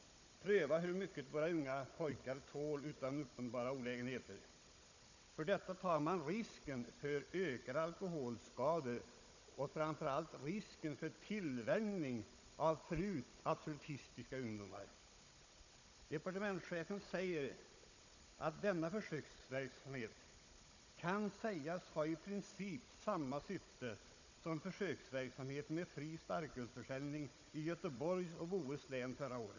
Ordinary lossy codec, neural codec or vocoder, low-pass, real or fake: none; codec, 16 kHz, 16 kbps, FunCodec, trained on Chinese and English, 50 frames a second; 7.2 kHz; fake